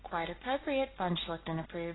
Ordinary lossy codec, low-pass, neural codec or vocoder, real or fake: AAC, 16 kbps; 7.2 kHz; none; real